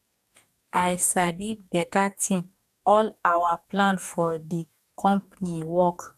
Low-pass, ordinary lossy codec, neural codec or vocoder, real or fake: 14.4 kHz; none; codec, 44.1 kHz, 2.6 kbps, DAC; fake